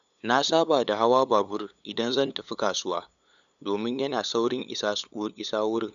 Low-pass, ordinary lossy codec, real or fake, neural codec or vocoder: 7.2 kHz; none; fake; codec, 16 kHz, 8 kbps, FunCodec, trained on LibriTTS, 25 frames a second